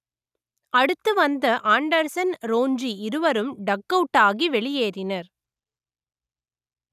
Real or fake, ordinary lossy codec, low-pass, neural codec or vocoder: real; none; 14.4 kHz; none